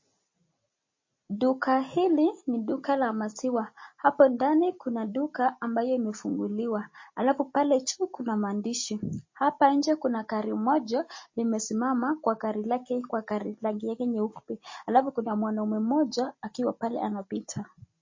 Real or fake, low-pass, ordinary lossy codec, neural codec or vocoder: real; 7.2 kHz; MP3, 32 kbps; none